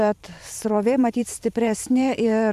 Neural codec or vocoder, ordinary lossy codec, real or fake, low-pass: vocoder, 44.1 kHz, 128 mel bands every 512 samples, BigVGAN v2; AAC, 96 kbps; fake; 14.4 kHz